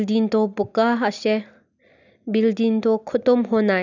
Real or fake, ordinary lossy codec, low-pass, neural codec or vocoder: real; none; 7.2 kHz; none